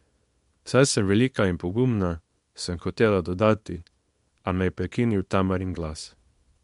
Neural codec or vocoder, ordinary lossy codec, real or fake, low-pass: codec, 24 kHz, 0.9 kbps, WavTokenizer, small release; MP3, 64 kbps; fake; 10.8 kHz